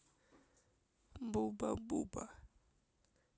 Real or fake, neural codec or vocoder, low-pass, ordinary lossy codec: real; none; none; none